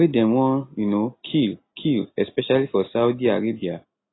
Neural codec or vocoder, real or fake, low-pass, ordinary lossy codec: none; real; 7.2 kHz; AAC, 16 kbps